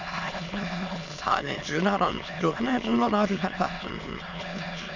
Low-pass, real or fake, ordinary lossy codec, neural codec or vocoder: 7.2 kHz; fake; AAC, 48 kbps; autoencoder, 22.05 kHz, a latent of 192 numbers a frame, VITS, trained on many speakers